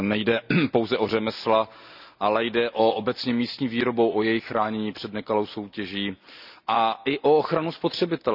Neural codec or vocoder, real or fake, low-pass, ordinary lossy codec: none; real; 5.4 kHz; none